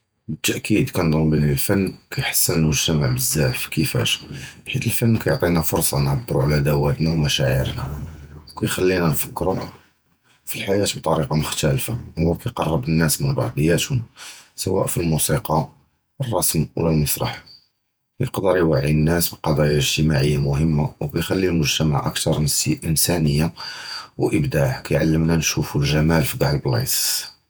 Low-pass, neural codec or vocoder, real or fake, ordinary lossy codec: none; vocoder, 48 kHz, 128 mel bands, Vocos; fake; none